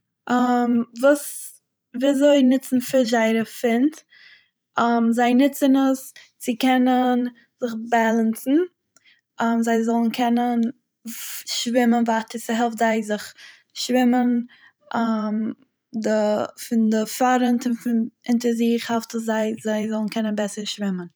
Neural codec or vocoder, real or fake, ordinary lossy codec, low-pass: vocoder, 44.1 kHz, 128 mel bands every 512 samples, BigVGAN v2; fake; none; none